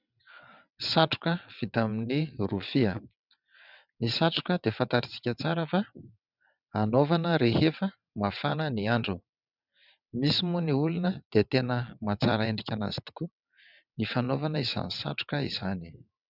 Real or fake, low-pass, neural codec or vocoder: fake; 5.4 kHz; vocoder, 22.05 kHz, 80 mel bands, WaveNeXt